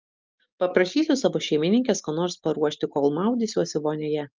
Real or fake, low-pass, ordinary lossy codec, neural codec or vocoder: real; 7.2 kHz; Opus, 24 kbps; none